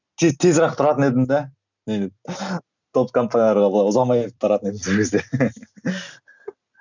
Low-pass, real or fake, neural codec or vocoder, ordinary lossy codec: 7.2 kHz; real; none; none